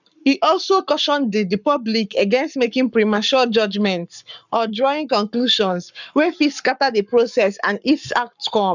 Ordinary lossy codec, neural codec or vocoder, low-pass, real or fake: none; codec, 44.1 kHz, 7.8 kbps, Pupu-Codec; 7.2 kHz; fake